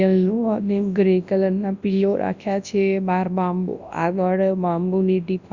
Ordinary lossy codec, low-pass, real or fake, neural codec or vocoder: Opus, 64 kbps; 7.2 kHz; fake; codec, 24 kHz, 0.9 kbps, WavTokenizer, large speech release